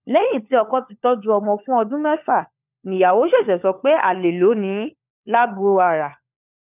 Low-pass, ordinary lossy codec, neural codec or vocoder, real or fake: 3.6 kHz; none; codec, 16 kHz, 4 kbps, FunCodec, trained on LibriTTS, 50 frames a second; fake